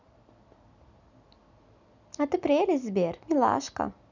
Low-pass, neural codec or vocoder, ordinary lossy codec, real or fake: 7.2 kHz; none; none; real